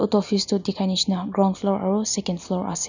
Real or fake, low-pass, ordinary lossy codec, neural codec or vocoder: real; 7.2 kHz; none; none